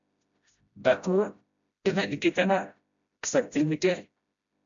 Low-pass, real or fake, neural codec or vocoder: 7.2 kHz; fake; codec, 16 kHz, 0.5 kbps, FreqCodec, smaller model